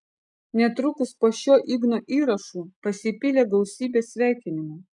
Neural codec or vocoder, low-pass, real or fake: none; 10.8 kHz; real